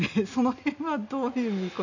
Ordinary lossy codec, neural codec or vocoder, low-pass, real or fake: none; none; 7.2 kHz; real